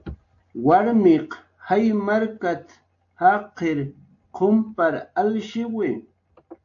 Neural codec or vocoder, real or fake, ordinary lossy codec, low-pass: none; real; AAC, 48 kbps; 7.2 kHz